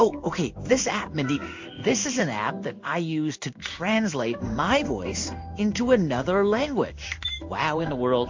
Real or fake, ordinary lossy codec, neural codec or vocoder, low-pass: fake; MP3, 48 kbps; codec, 16 kHz in and 24 kHz out, 1 kbps, XY-Tokenizer; 7.2 kHz